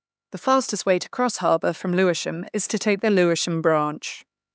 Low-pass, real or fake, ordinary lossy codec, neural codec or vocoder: none; fake; none; codec, 16 kHz, 4 kbps, X-Codec, HuBERT features, trained on LibriSpeech